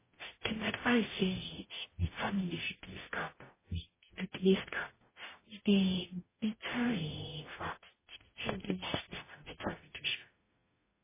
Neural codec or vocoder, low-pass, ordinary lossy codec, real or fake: codec, 44.1 kHz, 0.9 kbps, DAC; 3.6 kHz; MP3, 16 kbps; fake